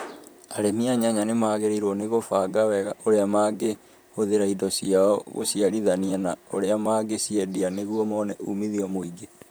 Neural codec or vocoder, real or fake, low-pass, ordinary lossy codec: vocoder, 44.1 kHz, 128 mel bands, Pupu-Vocoder; fake; none; none